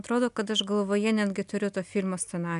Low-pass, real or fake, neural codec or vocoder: 10.8 kHz; real; none